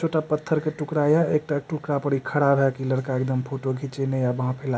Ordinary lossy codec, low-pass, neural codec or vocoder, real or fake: none; none; none; real